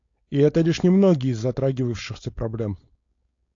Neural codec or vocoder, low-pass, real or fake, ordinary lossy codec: codec, 16 kHz, 4.8 kbps, FACodec; 7.2 kHz; fake; AAC, 48 kbps